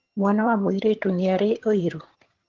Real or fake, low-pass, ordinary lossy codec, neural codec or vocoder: fake; 7.2 kHz; Opus, 16 kbps; vocoder, 22.05 kHz, 80 mel bands, HiFi-GAN